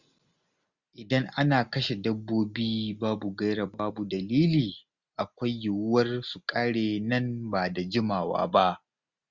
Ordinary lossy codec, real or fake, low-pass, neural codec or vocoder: Opus, 64 kbps; real; 7.2 kHz; none